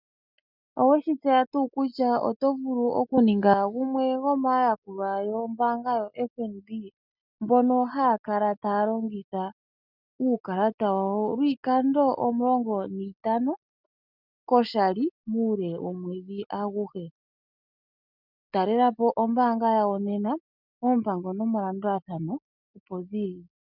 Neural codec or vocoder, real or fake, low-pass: none; real; 5.4 kHz